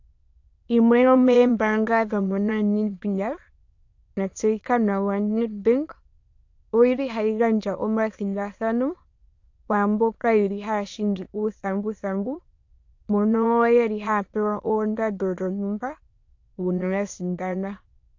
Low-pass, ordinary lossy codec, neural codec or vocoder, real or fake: 7.2 kHz; AAC, 48 kbps; autoencoder, 22.05 kHz, a latent of 192 numbers a frame, VITS, trained on many speakers; fake